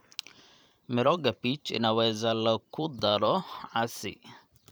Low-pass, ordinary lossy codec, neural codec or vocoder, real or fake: none; none; vocoder, 44.1 kHz, 128 mel bands every 512 samples, BigVGAN v2; fake